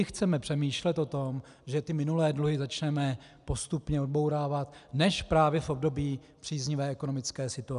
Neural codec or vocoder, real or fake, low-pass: none; real; 10.8 kHz